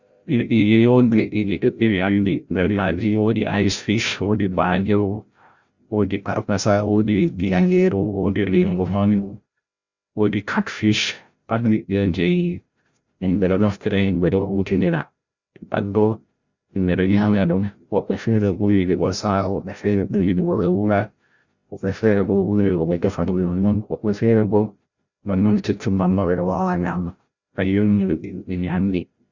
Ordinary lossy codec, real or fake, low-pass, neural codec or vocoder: Opus, 64 kbps; fake; 7.2 kHz; codec, 16 kHz, 0.5 kbps, FreqCodec, larger model